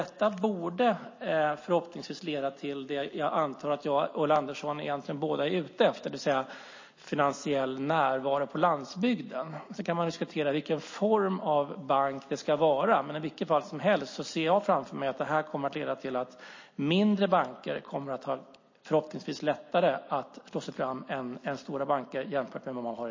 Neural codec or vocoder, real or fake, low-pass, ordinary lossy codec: none; real; 7.2 kHz; MP3, 32 kbps